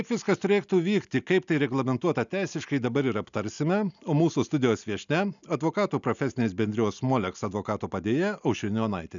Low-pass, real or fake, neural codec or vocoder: 7.2 kHz; real; none